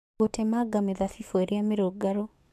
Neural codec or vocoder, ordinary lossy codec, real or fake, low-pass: codec, 44.1 kHz, 7.8 kbps, DAC; MP3, 96 kbps; fake; 14.4 kHz